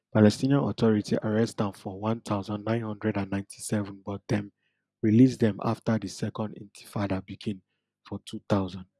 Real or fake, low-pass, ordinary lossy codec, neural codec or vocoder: real; none; none; none